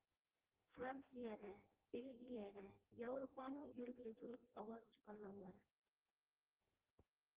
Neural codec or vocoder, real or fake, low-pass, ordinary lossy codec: codec, 16 kHz in and 24 kHz out, 0.6 kbps, FireRedTTS-2 codec; fake; 3.6 kHz; Opus, 16 kbps